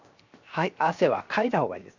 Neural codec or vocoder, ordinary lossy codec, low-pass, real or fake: codec, 16 kHz, 0.7 kbps, FocalCodec; none; 7.2 kHz; fake